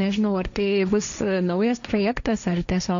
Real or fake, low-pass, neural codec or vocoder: fake; 7.2 kHz; codec, 16 kHz, 1.1 kbps, Voila-Tokenizer